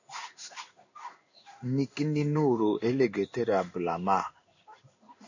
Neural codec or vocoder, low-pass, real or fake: codec, 16 kHz in and 24 kHz out, 1 kbps, XY-Tokenizer; 7.2 kHz; fake